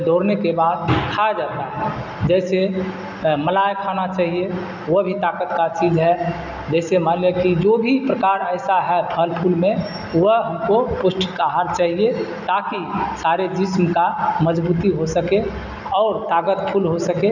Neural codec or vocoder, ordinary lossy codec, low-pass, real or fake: none; none; 7.2 kHz; real